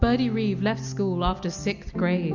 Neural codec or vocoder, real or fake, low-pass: none; real; 7.2 kHz